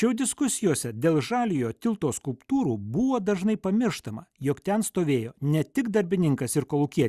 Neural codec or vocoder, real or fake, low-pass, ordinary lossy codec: none; real; 14.4 kHz; Opus, 64 kbps